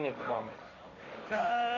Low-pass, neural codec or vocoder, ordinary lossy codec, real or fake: 7.2 kHz; codec, 16 kHz, 1.1 kbps, Voila-Tokenizer; AAC, 32 kbps; fake